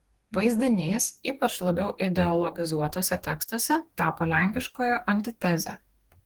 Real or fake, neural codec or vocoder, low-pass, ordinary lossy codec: fake; codec, 44.1 kHz, 2.6 kbps, DAC; 19.8 kHz; Opus, 24 kbps